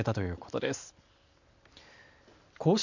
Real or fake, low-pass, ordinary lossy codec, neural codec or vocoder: real; 7.2 kHz; none; none